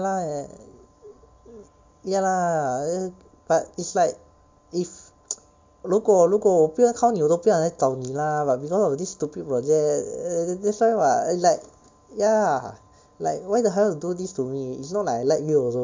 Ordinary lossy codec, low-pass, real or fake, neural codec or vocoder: MP3, 64 kbps; 7.2 kHz; real; none